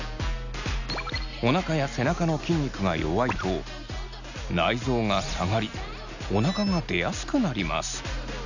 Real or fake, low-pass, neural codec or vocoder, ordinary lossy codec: real; 7.2 kHz; none; none